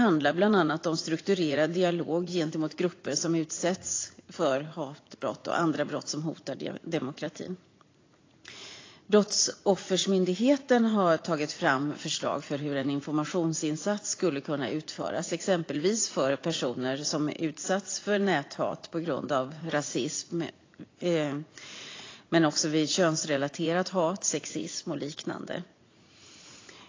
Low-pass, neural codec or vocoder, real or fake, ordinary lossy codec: 7.2 kHz; vocoder, 44.1 kHz, 80 mel bands, Vocos; fake; AAC, 32 kbps